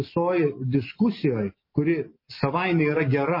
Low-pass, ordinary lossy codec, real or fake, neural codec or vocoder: 5.4 kHz; MP3, 24 kbps; real; none